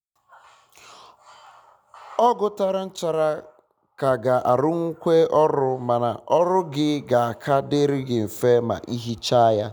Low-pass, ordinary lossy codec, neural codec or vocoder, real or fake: 19.8 kHz; none; none; real